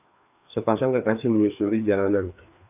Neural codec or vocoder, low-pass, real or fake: codec, 16 kHz, 2 kbps, FreqCodec, larger model; 3.6 kHz; fake